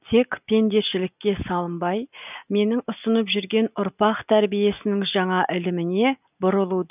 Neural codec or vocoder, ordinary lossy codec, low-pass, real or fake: none; none; 3.6 kHz; real